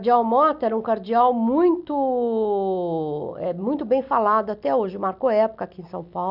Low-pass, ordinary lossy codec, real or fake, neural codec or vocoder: 5.4 kHz; none; real; none